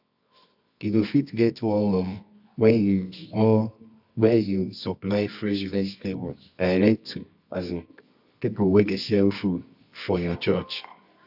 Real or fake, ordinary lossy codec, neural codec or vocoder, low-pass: fake; AAC, 48 kbps; codec, 24 kHz, 0.9 kbps, WavTokenizer, medium music audio release; 5.4 kHz